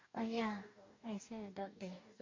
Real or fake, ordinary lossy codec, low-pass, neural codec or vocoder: fake; MP3, 48 kbps; 7.2 kHz; codec, 44.1 kHz, 2.6 kbps, DAC